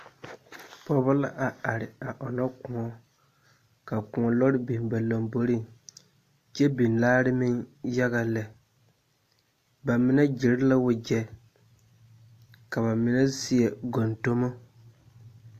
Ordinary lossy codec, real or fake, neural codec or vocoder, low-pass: AAC, 64 kbps; real; none; 14.4 kHz